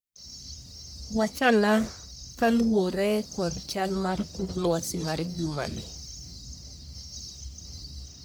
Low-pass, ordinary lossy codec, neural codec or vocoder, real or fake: none; none; codec, 44.1 kHz, 1.7 kbps, Pupu-Codec; fake